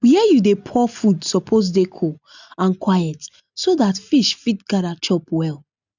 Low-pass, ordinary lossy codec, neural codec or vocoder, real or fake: 7.2 kHz; none; none; real